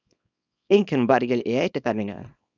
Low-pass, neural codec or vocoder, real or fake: 7.2 kHz; codec, 24 kHz, 0.9 kbps, WavTokenizer, small release; fake